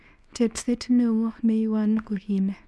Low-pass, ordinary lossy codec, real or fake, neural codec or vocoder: none; none; fake; codec, 24 kHz, 0.9 kbps, WavTokenizer, small release